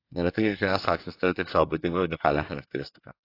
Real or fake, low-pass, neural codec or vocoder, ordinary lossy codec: fake; 5.4 kHz; codec, 24 kHz, 1 kbps, SNAC; AAC, 32 kbps